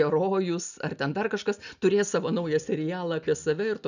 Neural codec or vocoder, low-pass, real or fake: none; 7.2 kHz; real